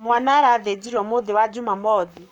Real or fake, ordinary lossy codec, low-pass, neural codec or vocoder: fake; none; 19.8 kHz; codec, 44.1 kHz, 7.8 kbps, Pupu-Codec